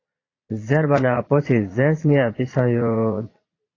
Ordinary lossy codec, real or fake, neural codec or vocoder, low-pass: AAC, 32 kbps; fake; vocoder, 22.05 kHz, 80 mel bands, Vocos; 7.2 kHz